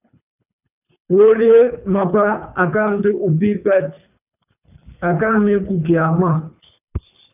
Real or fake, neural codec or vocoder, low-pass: fake; codec, 24 kHz, 3 kbps, HILCodec; 3.6 kHz